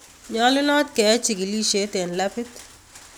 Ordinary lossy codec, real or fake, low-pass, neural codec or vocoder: none; real; none; none